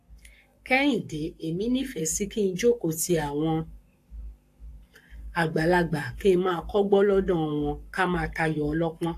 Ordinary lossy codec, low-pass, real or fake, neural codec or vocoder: AAC, 64 kbps; 14.4 kHz; fake; codec, 44.1 kHz, 7.8 kbps, Pupu-Codec